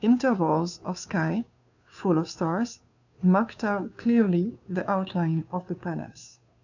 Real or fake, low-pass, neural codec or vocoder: fake; 7.2 kHz; codec, 16 kHz, 2 kbps, FunCodec, trained on Chinese and English, 25 frames a second